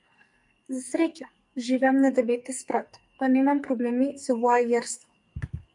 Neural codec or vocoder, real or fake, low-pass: codec, 44.1 kHz, 2.6 kbps, SNAC; fake; 10.8 kHz